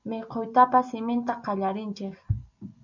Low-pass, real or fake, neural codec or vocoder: 7.2 kHz; real; none